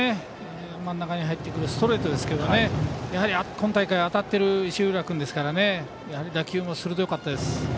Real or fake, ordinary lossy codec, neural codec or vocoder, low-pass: real; none; none; none